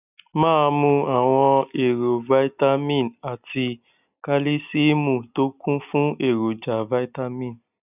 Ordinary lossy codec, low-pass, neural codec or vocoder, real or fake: none; 3.6 kHz; none; real